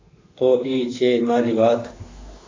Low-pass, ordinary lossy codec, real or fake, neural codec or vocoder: 7.2 kHz; AAC, 32 kbps; fake; autoencoder, 48 kHz, 32 numbers a frame, DAC-VAE, trained on Japanese speech